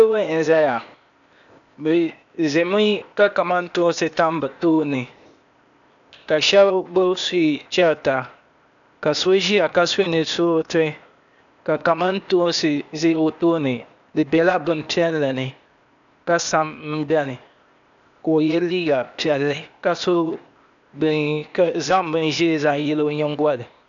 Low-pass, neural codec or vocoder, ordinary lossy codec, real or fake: 7.2 kHz; codec, 16 kHz, 0.8 kbps, ZipCodec; MP3, 96 kbps; fake